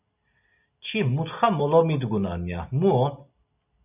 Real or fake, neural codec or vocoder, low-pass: real; none; 3.6 kHz